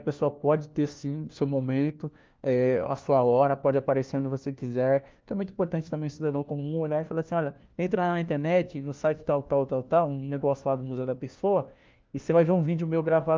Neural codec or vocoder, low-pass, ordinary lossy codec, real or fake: codec, 16 kHz, 1 kbps, FunCodec, trained on LibriTTS, 50 frames a second; 7.2 kHz; Opus, 32 kbps; fake